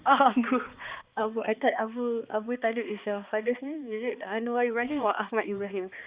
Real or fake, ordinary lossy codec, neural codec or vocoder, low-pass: fake; Opus, 64 kbps; codec, 16 kHz, 2 kbps, X-Codec, HuBERT features, trained on balanced general audio; 3.6 kHz